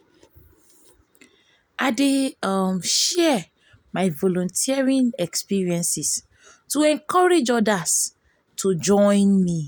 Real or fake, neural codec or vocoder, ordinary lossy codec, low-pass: real; none; none; none